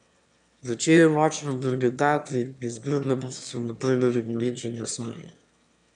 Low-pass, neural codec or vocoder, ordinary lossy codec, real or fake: 9.9 kHz; autoencoder, 22.05 kHz, a latent of 192 numbers a frame, VITS, trained on one speaker; none; fake